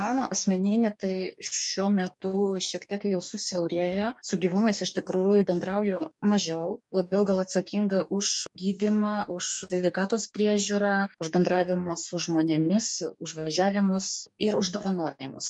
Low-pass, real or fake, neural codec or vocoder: 10.8 kHz; fake; codec, 44.1 kHz, 2.6 kbps, DAC